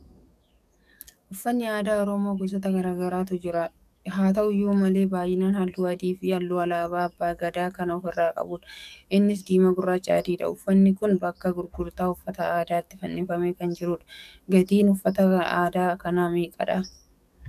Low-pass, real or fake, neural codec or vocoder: 14.4 kHz; fake; codec, 44.1 kHz, 7.8 kbps, DAC